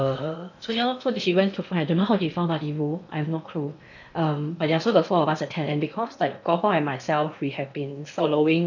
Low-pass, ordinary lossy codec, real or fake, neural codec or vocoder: 7.2 kHz; none; fake; codec, 16 kHz in and 24 kHz out, 0.8 kbps, FocalCodec, streaming, 65536 codes